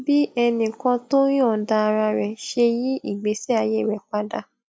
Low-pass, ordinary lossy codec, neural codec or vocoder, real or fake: none; none; none; real